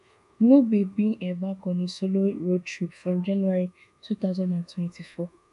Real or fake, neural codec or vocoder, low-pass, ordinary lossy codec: fake; codec, 24 kHz, 1.2 kbps, DualCodec; 10.8 kHz; none